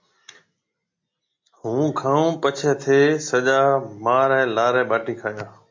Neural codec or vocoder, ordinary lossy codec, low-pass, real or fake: none; MP3, 48 kbps; 7.2 kHz; real